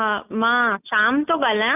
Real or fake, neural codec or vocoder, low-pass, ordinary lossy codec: real; none; 3.6 kHz; none